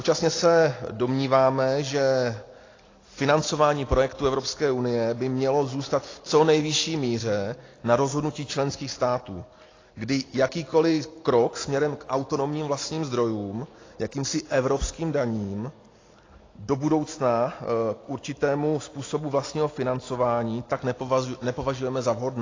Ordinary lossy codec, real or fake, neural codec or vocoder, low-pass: AAC, 32 kbps; real; none; 7.2 kHz